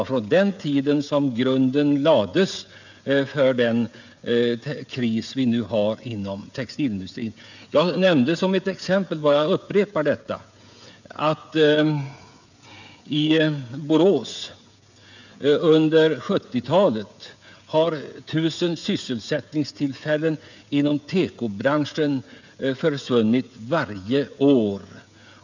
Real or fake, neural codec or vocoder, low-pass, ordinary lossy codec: fake; codec, 16 kHz, 16 kbps, FreqCodec, smaller model; 7.2 kHz; none